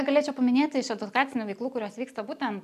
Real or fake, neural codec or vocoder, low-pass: real; none; 14.4 kHz